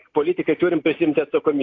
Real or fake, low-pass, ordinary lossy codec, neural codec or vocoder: real; 7.2 kHz; AAC, 48 kbps; none